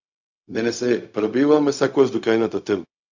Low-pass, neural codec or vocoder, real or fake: 7.2 kHz; codec, 16 kHz, 0.4 kbps, LongCat-Audio-Codec; fake